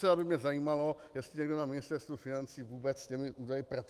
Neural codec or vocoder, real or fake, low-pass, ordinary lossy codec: autoencoder, 48 kHz, 128 numbers a frame, DAC-VAE, trained on Japanese speech; fake; 14.4 kHz; Opus, 32 kbps